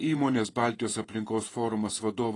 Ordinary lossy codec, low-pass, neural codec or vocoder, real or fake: AAC, 32 kbps; 10.8 kHz; vocoder, 48 kHz, 128 mel bands, Vocos; fake